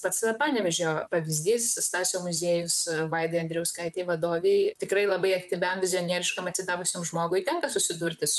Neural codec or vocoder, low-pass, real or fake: vocoder, 44.1 kHz, 128 mel bands, Pupu-Vocoder; 14.4 kHz; fake